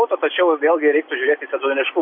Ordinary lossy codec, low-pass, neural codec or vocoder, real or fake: MP3, 32 kbps; 5.4 kHz; none; real